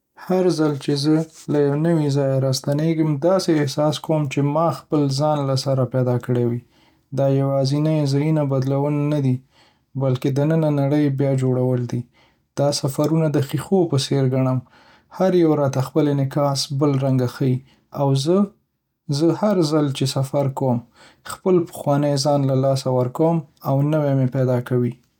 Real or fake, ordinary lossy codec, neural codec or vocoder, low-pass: real; none; none; 19.8 kHz